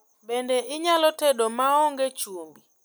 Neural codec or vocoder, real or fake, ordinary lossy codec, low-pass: none; real; none; none